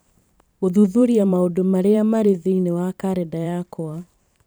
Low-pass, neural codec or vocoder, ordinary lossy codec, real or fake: none; vocoder, 44.1 kHz, 128 mel bands every 512 samples, BigVGAN v2; none; fake